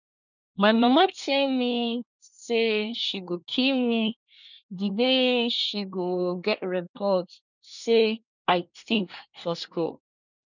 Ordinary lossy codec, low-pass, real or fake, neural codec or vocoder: none; 7.2 kHz; fake; codec, 24 kHz, 1 kbps, SNAC